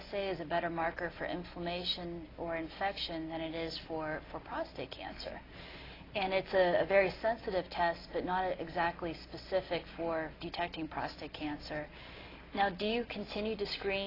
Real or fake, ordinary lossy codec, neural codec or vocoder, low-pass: real; AAC, 24 kbps; none; 5.4 kHz